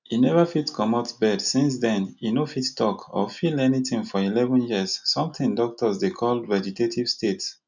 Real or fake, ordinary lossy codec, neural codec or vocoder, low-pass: real; none; none; 7.2 kHz